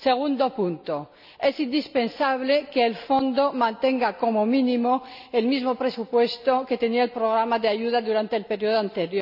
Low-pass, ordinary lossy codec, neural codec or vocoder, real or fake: 5.4 kHz; none; none; real